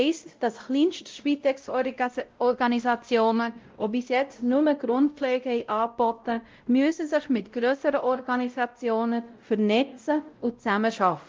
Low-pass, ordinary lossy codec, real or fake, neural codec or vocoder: 7.2 kHz; Opus, 24 kbps; fake; codec, 16 kHz, 0.5 kbps, X-Codec, WavLM features, trained on Multilingual LibriSpeech